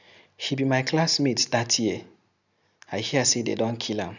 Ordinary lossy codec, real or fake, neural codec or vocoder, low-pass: none; real; none; 7.2 kHz